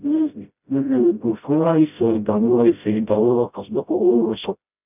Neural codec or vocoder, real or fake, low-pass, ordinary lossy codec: codec, 16 kHz, 0.5 kbps, FreqCodec, smaller model; fake; 3.6 kHz; none